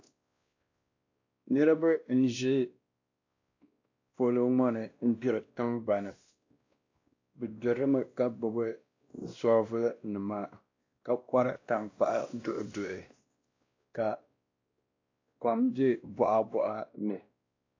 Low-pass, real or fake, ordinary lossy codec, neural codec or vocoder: 7.2 kHz; fake; AAC, 48 kbps; codec, 16 kHz, 1 kbps, X-Codec, WavLM features, trained on Multilingual LibriSpeech